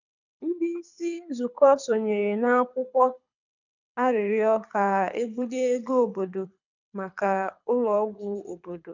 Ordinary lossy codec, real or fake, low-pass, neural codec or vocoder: none; fake; 7.2 kHz; codec, 24 kHz, 6 kbps, HILCodec